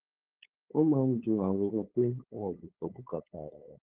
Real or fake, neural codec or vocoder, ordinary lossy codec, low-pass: fake; codec, 16 kHz, 8 kbps, FunCodec, trained on LibriTTS, 25 frames a second; Opus, 16 kbps; 3.6 kHz